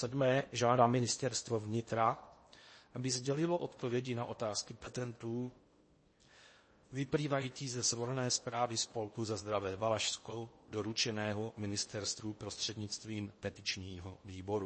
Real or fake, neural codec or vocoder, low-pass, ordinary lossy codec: fake; codec, 16 kHz in and 24 kHz out, 0.8 kbps, FocalCodec, streaming, 65536 codes; 9.9 kHz; MP3, 32 kbps